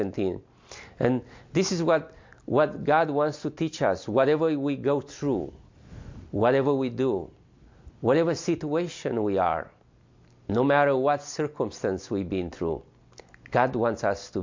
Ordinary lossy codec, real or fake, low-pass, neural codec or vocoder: MP3, 48 kbps; real; 7.2 kHz; none